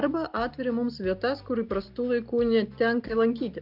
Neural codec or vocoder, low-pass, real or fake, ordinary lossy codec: none; 5.4 kHz; real; AAC, 48 kbps